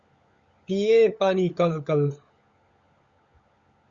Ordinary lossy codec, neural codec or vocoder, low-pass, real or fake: Opus, 64 kbps; codec, 16 kHz, 16 kbps, FunCodec, trained on LibriTTS, 50 frames a second; 7.2 kHz; fake